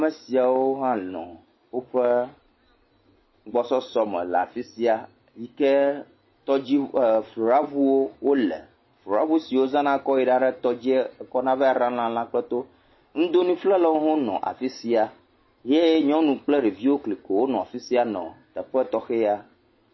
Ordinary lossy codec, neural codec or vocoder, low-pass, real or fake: MP3, 24 kbps; none; 7.2 kHz; real